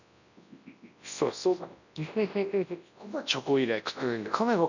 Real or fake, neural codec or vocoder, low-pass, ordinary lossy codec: fake; codec, 24 kHz, 0.9 kbps, WavTokenizer, large speech release; 7.2 kHz; none